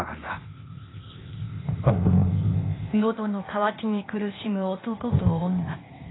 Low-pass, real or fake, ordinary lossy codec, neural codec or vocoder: 7.2 kHz; fake; AAC, 16 kbps; codec, 16 kHz, 0.8 kbps, ZipCodec